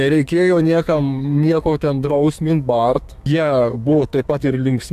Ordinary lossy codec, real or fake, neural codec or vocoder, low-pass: AAC, 96 kbps; fake; codec, 32 kHz, 1.9 kbps, SNAC; 14.4 kHz